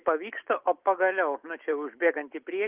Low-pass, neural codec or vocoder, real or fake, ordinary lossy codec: 3.6 kHz; none; real; Opus, 32 kbps